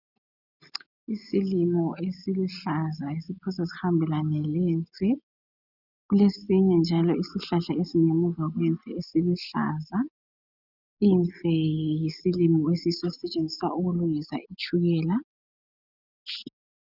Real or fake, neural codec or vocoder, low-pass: real; none; 5.4 kHz